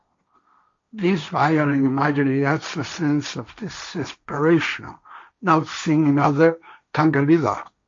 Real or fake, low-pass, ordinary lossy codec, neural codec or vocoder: fake; 7.2 kHz; MP3, 48 kbps; codec, 16 kHz, 1.1 kbps, Voila-Tokenizer